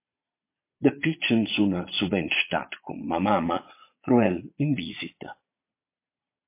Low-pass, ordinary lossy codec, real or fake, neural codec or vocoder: 3.6 kHz; MP3, 24 kbps; fake; vocoder, 22.05 kHz, 80 mel bands, Vocos